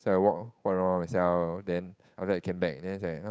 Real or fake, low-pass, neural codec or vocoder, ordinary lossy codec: fake; none; codec, 16 kHz, 8 kbps, FunCodec, trained on Chinese and English, 25 frames a second; none